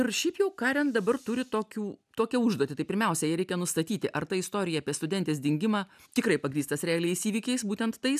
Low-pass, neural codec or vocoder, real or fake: 14.4 kHz; none; real